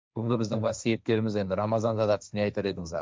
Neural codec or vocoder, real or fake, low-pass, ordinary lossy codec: codec, 16 kHz, 1.1 kbps, Voila-Tokenizer; fake; none; none